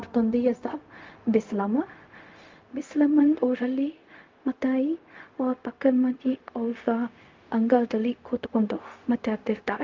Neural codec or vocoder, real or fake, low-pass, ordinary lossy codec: codec, 16 kHz, 0.4 kbps, LongCat-Audio-Codec; fake; 7.2 kHz; Opus, 32 kbps